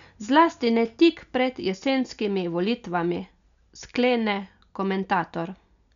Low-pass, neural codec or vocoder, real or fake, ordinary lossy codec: 7.2 kHz; none; real; none